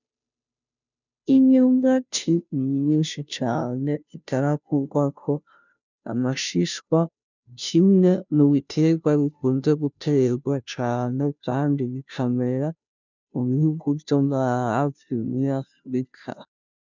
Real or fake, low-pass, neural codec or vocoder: fake; 7.2 kHz; codec, 16 kHz, 0.5 kbps, FunCodec, trained on Chinese and English, 25 frames a second